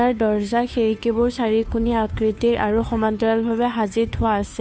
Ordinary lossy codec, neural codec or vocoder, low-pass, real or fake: none; codec, 16 kHz, 2 kbps, FunCodec, trained on Chinese and English, 25 frames a second; none; fake